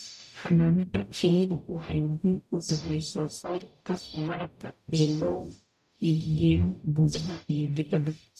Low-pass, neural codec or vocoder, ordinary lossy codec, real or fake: 14.4 kHz; codec, 44.1 kHz, 0.9 kbps, DAC; none; fake